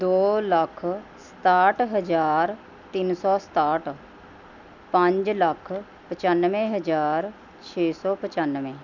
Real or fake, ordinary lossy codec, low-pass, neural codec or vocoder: real; none; 7.2 kHz; none